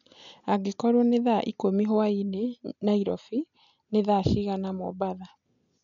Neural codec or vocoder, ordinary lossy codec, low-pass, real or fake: none; none; 7.2 kHz; real